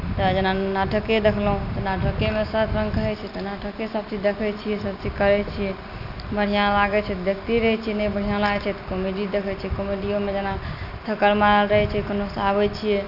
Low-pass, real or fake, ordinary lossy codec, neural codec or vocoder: 5.4 kHz; real; none; none